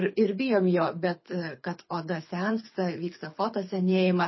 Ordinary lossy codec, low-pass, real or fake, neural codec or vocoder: MP3, 24 kbps; 7.2 kHz; fake; codec, 24 kHz, 6 kbps, HILCodec